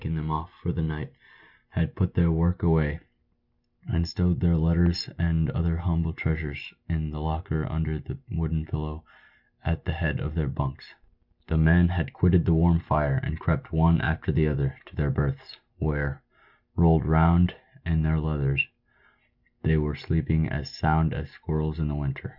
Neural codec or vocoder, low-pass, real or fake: none; 5.4 kHz; real